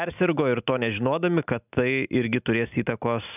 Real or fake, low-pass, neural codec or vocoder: real; 3.6 kHz; none